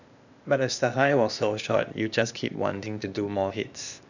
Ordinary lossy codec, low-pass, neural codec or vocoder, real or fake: none; 7.2 kHz; codec, 16 kHz, 0.8 kbps, ZipCodec; fake